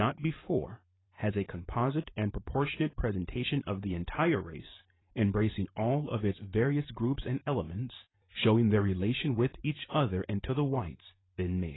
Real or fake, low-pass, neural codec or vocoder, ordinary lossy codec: fake; 7.2 kHz; vocoder, 44.1 kHz, 128 mel bands every 512 samples, BigVGAN v2; AAC, 16 kbps